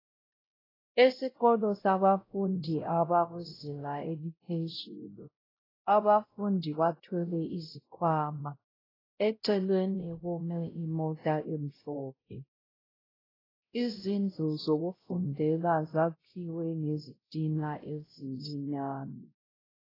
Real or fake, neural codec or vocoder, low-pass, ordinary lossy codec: fake; codec, 16 kHz, 0.5 kbps, X-Codec, WavLM features, trained on Multilingual LibriSpeech; 5.4 kHz; AAC, 24 kbps